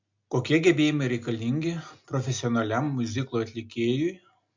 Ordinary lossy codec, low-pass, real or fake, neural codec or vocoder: MP3, 64 kbps; 7.2 kHz; real; none